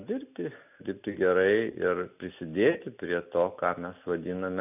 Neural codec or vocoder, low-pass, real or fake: none; 3.6 kHz; real